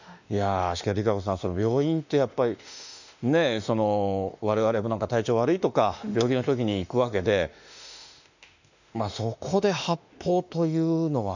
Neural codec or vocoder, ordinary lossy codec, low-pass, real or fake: autoencoder, 48 kHz, 32 numbers a frame, DAC-VAE, trained on Japanese speech; none; 7.2 kHz; fake